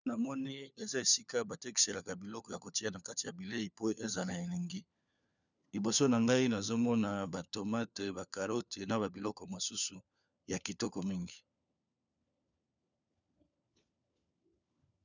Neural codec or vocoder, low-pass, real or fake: codec, 16 kHz, 4 kbps, FunCodec, trained on LibriTTS, 50 frames a second; 7.2 kHz; fake